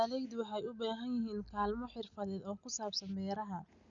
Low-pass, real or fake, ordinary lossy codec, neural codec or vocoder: 7.2 kHz; real; none; none